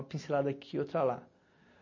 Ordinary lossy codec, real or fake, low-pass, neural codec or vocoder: MP3, 32 kbps; real; 7.2 kHz; none